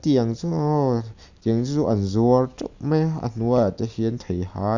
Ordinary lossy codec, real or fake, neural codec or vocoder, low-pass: Opus, 64 kbps; real; none; 7.2 kHz